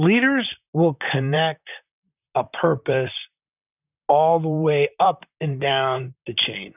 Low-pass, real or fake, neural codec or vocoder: 3.6 kHz; fake; vocoder, 44.1 kHz, 128 mel bands, Pupu-Vocoder